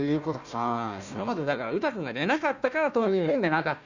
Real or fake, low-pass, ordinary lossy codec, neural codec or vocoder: fake; 7.2 kHz; none; codec, 16 kHz, 1 kbps, FunCodec, trained on Chinese and English, 50 frames a second